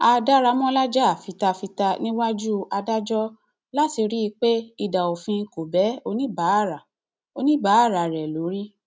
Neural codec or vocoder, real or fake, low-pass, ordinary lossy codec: none; real; none; none